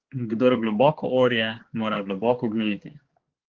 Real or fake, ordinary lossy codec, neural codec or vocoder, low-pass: fake; Opus, 16 kbps; codec, 16 kHz, 2 kbps, X-Codec, HuBERT features, trained on balanced general audio; 7.2 kHz